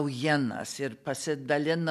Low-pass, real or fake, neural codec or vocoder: 14.4 kHz; real; none